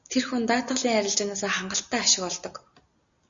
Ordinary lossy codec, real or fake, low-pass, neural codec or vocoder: Opus, 64 kbps; real; 7.2 kHz; none